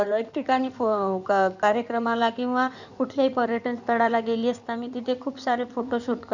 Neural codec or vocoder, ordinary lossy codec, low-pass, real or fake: codec, 16 kHz in and 24 kHz out, 2.2 kbps, FireRedTTS-2 codec; none; 7.2 kHz; fake